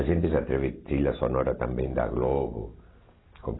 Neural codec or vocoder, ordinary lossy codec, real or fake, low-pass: none; AAC, 16 kbps; real; 7.2 kHz